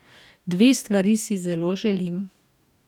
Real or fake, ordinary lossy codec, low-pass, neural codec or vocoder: fake; none; 19.8 kHz; codec, 44.1 kHz, 2.6 kbps, DAC